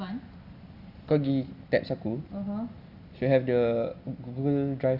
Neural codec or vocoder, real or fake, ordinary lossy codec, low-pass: none; real; Opus, 64 kbps; 5.4 kHz